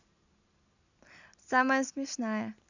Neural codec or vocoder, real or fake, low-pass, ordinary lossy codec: none; real; 7.2 kHz; none